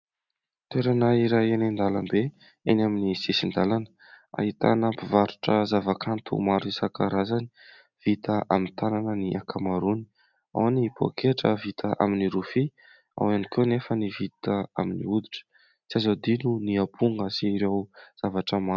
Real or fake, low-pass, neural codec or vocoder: real; 7.2 kHz; none